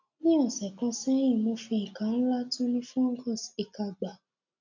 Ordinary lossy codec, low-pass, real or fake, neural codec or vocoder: none; 7.2 kHz; real; none